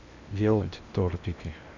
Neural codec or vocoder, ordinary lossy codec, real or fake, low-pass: codec, 16 kHz in and 24 kHz out, 0.8 kbps, FocalCodec, streaming, 65536 codes; none; fake; 7.2 kHz